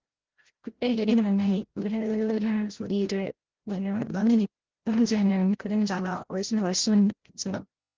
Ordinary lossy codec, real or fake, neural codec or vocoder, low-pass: Opus, 16 kbps; fake; codec, 16 kHz, 0.5 kbps, FreqCodec, larger model; 7.2 kHz